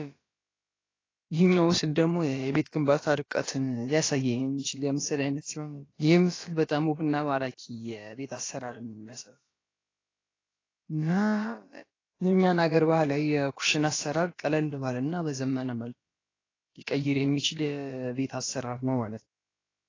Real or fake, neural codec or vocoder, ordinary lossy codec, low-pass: fake; codec, 16 kHz, about 1 kbps, DyCAST, with the encoder's durations; AAC, 32 kbps; 7.2 kHz